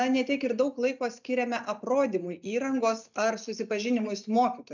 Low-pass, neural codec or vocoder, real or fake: 7.2 kHz; none; real